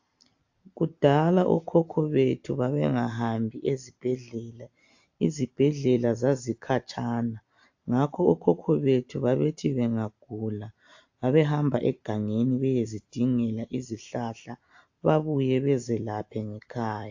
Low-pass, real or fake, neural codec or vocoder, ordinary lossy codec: 7.2 kHz; real; none; AAC, 48 kbps